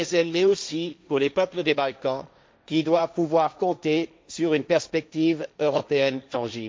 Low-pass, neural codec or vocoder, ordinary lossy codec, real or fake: none; codec, 16 kHz, 1.1 kbps, Voila-Tokenizer; none; fake